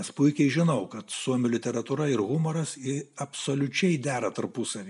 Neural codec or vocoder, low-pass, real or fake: none; 10.8 kHz; real